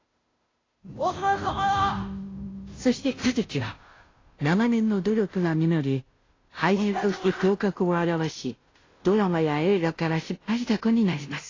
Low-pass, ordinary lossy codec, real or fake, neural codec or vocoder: 7.2 kHz; AAC, 32 kbps; fake; codec, 16 kHz, 0.5 kbps, FunCodec, trained on Chinese and English, 25 frames a second